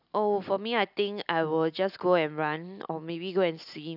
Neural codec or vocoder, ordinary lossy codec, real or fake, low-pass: none; none; real; 5.4 kHz